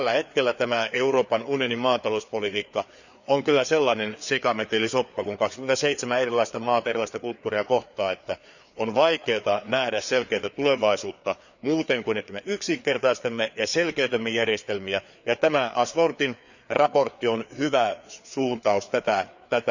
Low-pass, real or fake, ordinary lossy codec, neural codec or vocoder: 7.2 kHz; fake; none; codec, 16 kHz, 4 kbps, FreqCodec, larger model